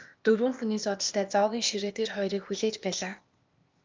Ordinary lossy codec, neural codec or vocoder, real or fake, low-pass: Opus, 24 kbps; codec, 16 kHz, 0.8 kbps, ZipCodec; fake; 7.2 kHz